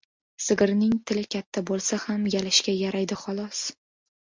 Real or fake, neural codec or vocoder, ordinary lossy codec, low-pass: real; none; MP3, 64 kbps; 7.2 kHz